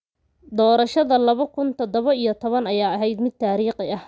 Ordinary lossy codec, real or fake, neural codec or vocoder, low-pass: none; real; none; none